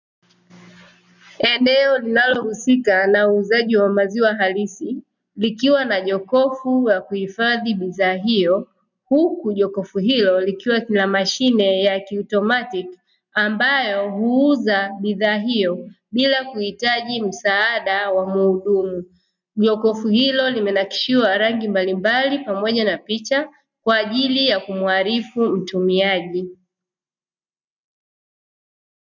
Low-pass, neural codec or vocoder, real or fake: 7.2 kHz; none; real